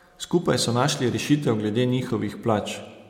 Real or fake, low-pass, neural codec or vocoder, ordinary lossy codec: real; 19.8 kHz; none; MP3, 96 kbps